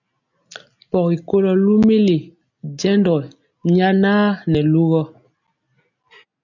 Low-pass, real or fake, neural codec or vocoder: 7.2 kHz; real; none